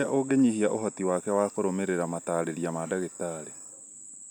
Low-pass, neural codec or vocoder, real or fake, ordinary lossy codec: none; none; real; none